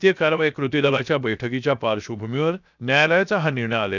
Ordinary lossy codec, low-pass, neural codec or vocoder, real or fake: none; 7.2 kHz; codec, 16 kHz, 0.7 kbps, FocalCodec; fake